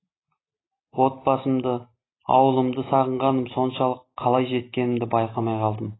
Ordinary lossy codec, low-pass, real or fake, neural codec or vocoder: AAC, 16 kbps; 7.2 kHz; real; none